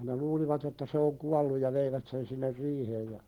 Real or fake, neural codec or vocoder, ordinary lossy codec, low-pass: real; none; Opus, 16 kbps; 19.8 kHz